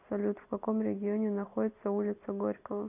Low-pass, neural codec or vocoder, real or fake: 3.6 kHz; none; real